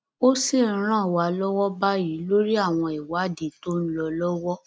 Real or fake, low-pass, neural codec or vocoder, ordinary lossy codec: real; none; none; none